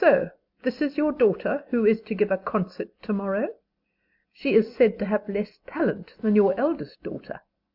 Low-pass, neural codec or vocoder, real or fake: 5.4 kHz; none; real